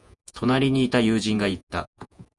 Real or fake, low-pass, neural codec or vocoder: fake; 10.8 kHz; vocoder, 48 kHz, 128 mel bands, Vocos